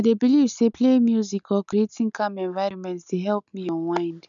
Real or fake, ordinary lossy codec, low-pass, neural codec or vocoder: real; none; 7.2 kHz; none